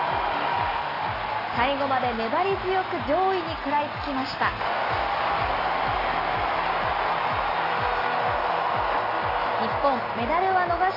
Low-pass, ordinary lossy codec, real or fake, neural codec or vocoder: 5.4 kHz; AAC, 24 kbps; real; none